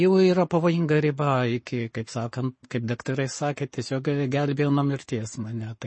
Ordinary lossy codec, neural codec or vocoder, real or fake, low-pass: MP3, 32 kbps; codec, 44.1 kHz, 7.8 kbps, Pupu-Codec; fake; 9.9 kHz